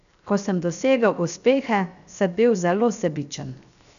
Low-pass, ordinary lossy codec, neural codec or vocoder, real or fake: 7.2 kHz; none; codec, 16 kHz, 0.7 kbps, FocalCodec; fake